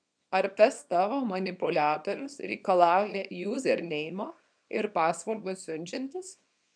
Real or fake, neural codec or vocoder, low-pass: fake; codec, 24 kHz, 0.9 kbps, WavTokenizer, small release; 9.9 kHz